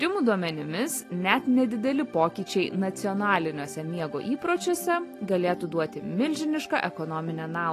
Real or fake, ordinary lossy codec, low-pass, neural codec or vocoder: real; AAC, 48 kbps; 14.4 kHz; none